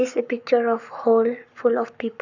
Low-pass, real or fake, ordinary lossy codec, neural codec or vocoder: 7.2 kHz; fake; none; codec, 44.1 kHz, 7.8 kbps, Pupu-Codec